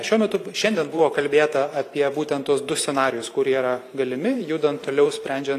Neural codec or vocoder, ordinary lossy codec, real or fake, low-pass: vocoder, 44.1 kHz, 128 mel bands every 512 samples, BigVGAN v2; AAC, 64 kbps; fake; 14.4 kHz